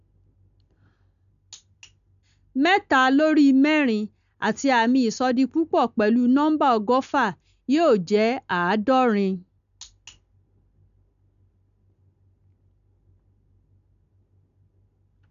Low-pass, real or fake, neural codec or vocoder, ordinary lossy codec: 7.2 kHz; real; none; none